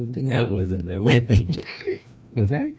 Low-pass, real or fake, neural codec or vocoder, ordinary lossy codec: none; fake; codec, 16 kHz, 1 kbps, FreqCodec, larger model; none